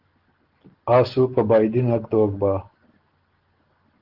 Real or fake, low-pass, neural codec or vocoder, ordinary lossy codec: real; 5.4 kHz; none; Opus, 16 kbps